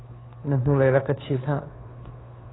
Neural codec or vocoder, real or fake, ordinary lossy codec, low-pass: codec, 16 kHz, 2 kbps, FunCodec, trained on Chinese and English, 25 frames a second; fake; AAC, 16 kbps; 7.2 kHz